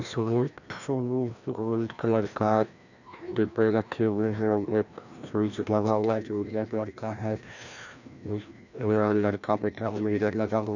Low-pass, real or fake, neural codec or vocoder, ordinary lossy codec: 7.2 kHz; fake; codec, 16 kHz, 1 kbps, FreqCodec, larger model; none